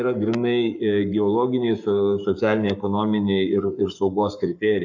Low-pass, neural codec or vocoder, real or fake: 7.2 kHz; codec, 44.1 kHz, 7.8 kbps, Pupu-Codec; fake